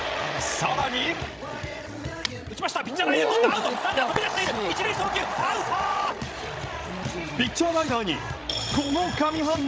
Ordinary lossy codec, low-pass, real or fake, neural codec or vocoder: none; none; fake; codec, 16 kHz, 16 kbps, FreqCodec, larger model